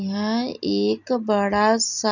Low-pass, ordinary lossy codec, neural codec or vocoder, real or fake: 7.2 kHz; none; none; real